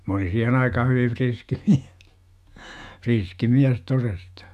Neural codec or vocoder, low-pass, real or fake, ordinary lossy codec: autoencoder, 48 kHz, 128 numbers a frame, DAC-VAE, trained on Japanese speech; 14.4 kHz; fake; none